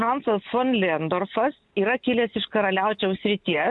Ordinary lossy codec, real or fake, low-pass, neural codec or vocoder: MP3, 64 kbps; real; 10.8 kHz; none